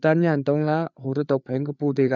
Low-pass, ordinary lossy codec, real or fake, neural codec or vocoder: 7.2 kHz; none; fake; codec, 16 kHz, 4 kbps, FreqCodec, larger model